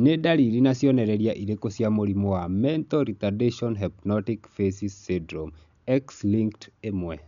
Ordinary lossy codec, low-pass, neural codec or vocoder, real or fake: none; 7.2 kHz; none; real